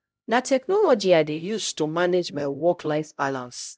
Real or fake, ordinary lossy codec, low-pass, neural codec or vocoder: fake; none; none; codec, 16 kHz, 0.5 kbps, X-Codec, HuBERT features, trained on LibriSpeech